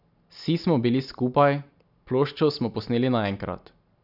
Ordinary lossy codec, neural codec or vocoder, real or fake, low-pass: none; none; real; 5.4 kHz